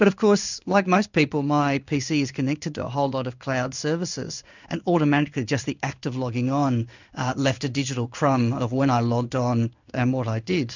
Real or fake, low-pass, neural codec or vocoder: fake; 7.2 kHz; codec, 16 kHz in and 24 kHz out, 1 kbps, XY-Tokenizer